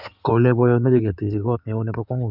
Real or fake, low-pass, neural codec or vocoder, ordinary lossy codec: fake; 5.4 kHz; codec, 16 kHz in and 24 kHz out, 2.2 kbps, FireRedTTS-2 codec; none